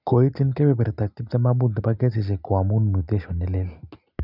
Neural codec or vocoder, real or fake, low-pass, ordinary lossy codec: none; real; 5.4 kHz; none